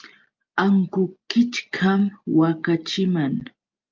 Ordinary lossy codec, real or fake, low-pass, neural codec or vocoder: Opus, 24 kbps; real; 7.2 kHz; none